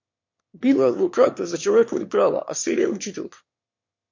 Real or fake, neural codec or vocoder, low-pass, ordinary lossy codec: fake; autoencoder, 22.05 kHz, a latent of 192 numbers a frame, VITS, trained on one speaker; 7.2 kHz; MP3, 48 kbps